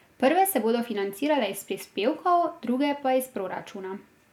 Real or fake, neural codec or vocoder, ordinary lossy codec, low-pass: real; none; none; 19.8 kHz